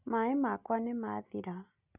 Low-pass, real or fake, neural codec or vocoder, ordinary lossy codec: 3.6 kHz; real; none; none